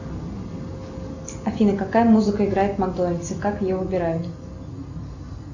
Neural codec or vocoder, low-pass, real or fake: none; 7.2 kHz; real